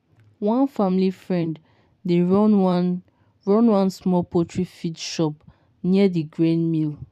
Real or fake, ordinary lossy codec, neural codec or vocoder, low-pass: fake; none; vocoder, 44.1 kHz, 128 mel bands every 512 samples, BigVGAN v2; 14.4 kHz